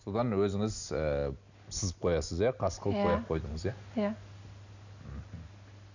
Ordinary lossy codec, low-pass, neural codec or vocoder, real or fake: none; 7.2 kHz; none; real